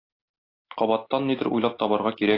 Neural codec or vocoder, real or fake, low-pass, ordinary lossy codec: none; real; 5.4 kHz; AAC, 24 kbps